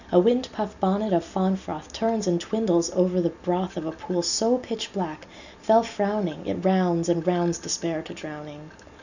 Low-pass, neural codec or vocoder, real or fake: 7.2 kHz; none; real